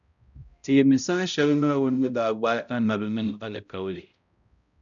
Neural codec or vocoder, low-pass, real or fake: codec, 16 kHz, 0.5 kbps, X-Codec, HuBERT features, trained on balanced general audio; 7.2 kHz; fake